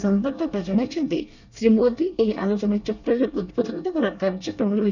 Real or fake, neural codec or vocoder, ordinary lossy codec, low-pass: fake; codec, 24 kHz, 1 kbps, SNAC; none; 7.2 kHz